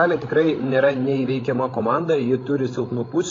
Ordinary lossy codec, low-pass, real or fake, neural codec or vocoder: AAC, 32 kbps; 7.2 kHz; fake; codec, 16 kHz, 16 kbps, FreqCodec, larger model